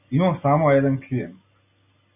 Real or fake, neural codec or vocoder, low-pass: real; none; 3.6 kHz